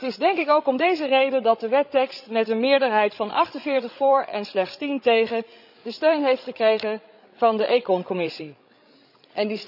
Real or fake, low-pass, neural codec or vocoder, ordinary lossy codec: fake; 5.4 kHz; codec, 16 kHz, 16 kbps, FreqCodec, larger model; none